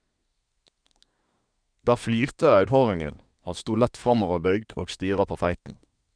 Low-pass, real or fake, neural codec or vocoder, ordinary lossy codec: 9.9 kHz; fake; codec, 24 kHz, 1 kbps, SNAC; none